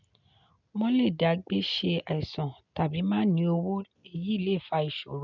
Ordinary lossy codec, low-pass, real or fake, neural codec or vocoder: none; 7.2 kHz; real; none